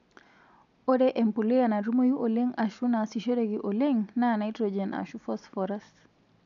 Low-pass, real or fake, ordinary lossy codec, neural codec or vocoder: 7.2 kHz; real; none; none